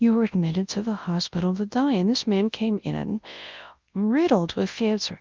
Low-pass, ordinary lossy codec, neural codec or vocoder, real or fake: 7.2 kHz; Opus, 24 kbps; codec, 24 kHz, 0.9 kbps, WavTokenizer, large speech release; fake